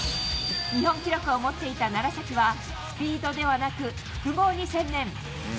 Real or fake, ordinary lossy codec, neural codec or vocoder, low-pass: real; none; none; none